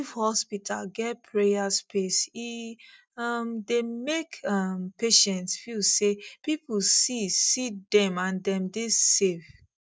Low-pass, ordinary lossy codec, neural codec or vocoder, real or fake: none; none; none; real